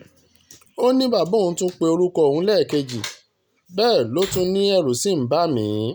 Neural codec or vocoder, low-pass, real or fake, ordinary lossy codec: none; none; real; none